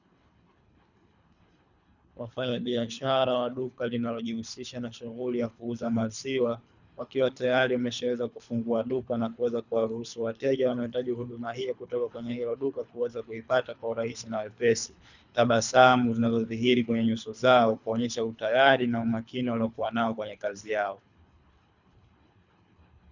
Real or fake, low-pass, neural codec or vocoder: fake; 7.2 kHz; codec, 24 kHz, 3 kbps, HILCodec